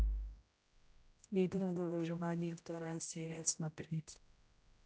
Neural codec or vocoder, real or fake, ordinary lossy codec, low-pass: codec, 16 kHz, 0.5 kbps, X-Codec, HuBERT features, trained on general audio; fake; none; none